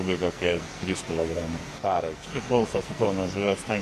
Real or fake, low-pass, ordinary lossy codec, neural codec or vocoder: fake; 14.4 kHz; Opus, 64 kbps; codec, 44.1 kHz, 2.6 kbps, SNAC